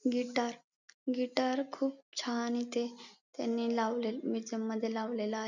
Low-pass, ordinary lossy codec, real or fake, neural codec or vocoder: 7.2 kHz; none; real; none